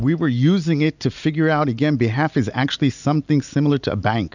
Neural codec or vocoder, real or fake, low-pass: none; real; 7.2 kHz